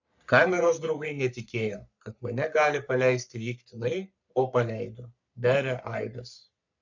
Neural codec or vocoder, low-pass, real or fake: codec, 44.1 kHz, 3.4 kbps, Pupu-Codec; 7.2 kHz; fake